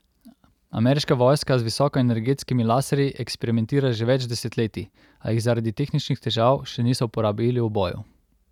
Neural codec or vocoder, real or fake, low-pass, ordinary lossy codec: none; real; 19.8 kHz; none